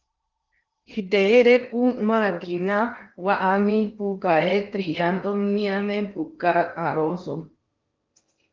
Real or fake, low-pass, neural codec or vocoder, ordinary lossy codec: fake; 7.2 kHz; codec, 16 kHz in and 24 kHz out, 0.8 kbps, FocalCodec, streaming, 65536 codes; Opus, 24 kbps